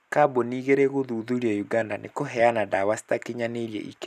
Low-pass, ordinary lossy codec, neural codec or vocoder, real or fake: 14.4 kHz; none; none; real